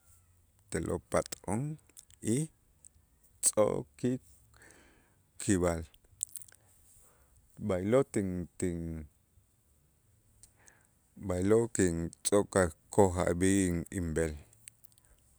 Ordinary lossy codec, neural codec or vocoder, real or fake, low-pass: none; none; real; none